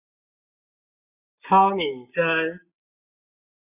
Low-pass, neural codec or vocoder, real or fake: 3.6 kHz; none; real